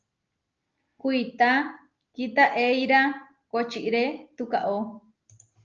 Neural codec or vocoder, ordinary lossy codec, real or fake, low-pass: none; Opus, 24 kbps; real; 7.2 kHz